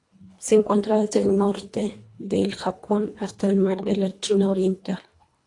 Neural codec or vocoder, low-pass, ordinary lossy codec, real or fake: codec, 24 kHz, 1.5 kbps, HILCodec; 10.8 kHz; AAC, 48 kbps; fake